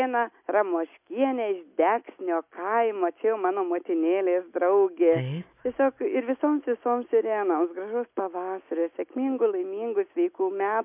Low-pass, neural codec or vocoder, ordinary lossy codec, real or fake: 3.6 kHz; none; MP3, 32 kbps; real